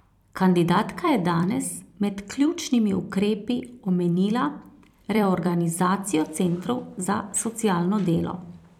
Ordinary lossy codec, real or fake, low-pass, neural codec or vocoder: none; fake; 19.8 kHz; vocoder, 44.1 kHz, 128 mel bands every 256 samples, BigVGAN v2